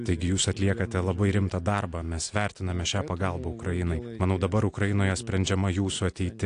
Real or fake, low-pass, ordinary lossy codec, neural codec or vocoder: real; 9.9 kHz; AAC, 64 kbps; none